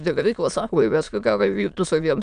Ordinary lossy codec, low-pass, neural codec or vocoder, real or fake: AAC, 96 kbps; 9.9 kHz; autoencoder, 22.05 kHz, a latent of 192 numbers a frame, VITS, trained on many speakers; fake